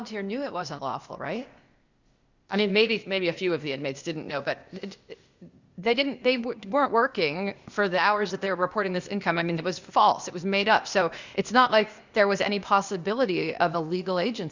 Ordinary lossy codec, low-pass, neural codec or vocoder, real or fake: Opus, 64 kbps; 7.2 kHz; codec, 16 kHz, 0.8 kbps, ZipCodec; fake